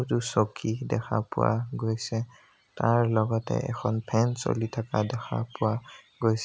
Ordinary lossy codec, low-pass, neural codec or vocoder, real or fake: none; none; none; real